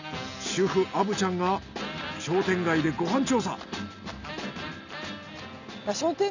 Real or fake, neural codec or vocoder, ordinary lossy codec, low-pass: real; none; none; 7.2 kHz